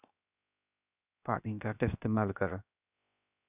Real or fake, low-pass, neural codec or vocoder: fake; 3.6 kHz; codec, 16 kHz, 0.7 kbps, FocalCodec